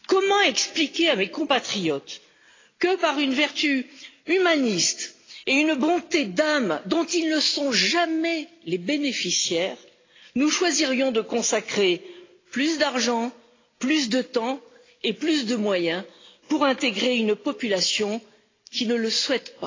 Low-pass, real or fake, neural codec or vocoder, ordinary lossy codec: 7.2 kHz; real; none; AAC, 32 kbps